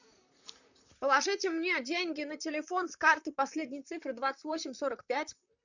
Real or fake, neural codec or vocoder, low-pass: fake; vocoder, 44.1 kHz, 128 mel bands, Pupu-Vocoder; 7.2 kHz